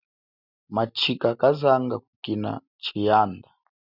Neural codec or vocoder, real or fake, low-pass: none; real; 5.4 kHz